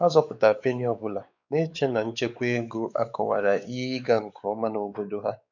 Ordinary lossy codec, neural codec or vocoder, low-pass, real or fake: none; codec, 16 kHz, 4 kbps, X-Codec, WavLM features, trained on Multilingual LibriSpeech; 7.2 kHz; fake